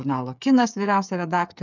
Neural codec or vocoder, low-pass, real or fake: codec, 16 kHz, 8 kbps, FreqCodec, smaller model; 7.2 kHz; fake